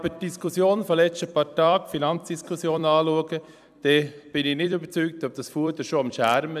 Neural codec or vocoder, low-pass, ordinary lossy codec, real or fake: vocoder, 44.1 kHz, 128 mel bands every 256 samples, BigVGAN v2; 14.4 kHz; none; fake